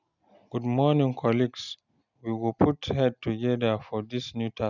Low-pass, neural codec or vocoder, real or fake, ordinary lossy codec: 7.2 kHz; none; real; none